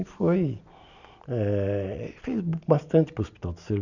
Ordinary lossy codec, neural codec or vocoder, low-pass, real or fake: none; none; 7.2 kHz; real